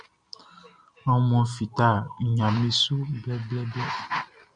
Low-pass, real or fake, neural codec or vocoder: 9.9 kHz; real; none